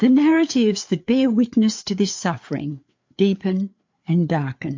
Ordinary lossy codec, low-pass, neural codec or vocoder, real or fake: MP3, 48 kbps; 7.2 kHz; codec, 16 kHz, 4 kbps, FreqCodec, larger model; fake